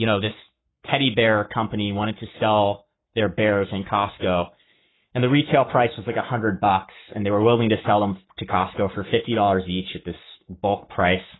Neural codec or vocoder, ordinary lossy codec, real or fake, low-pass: codec, 44.1 kHz, 7.8 kbps, Pupu-Codec; AAC, 16 kbps; fake; 7.2 kHz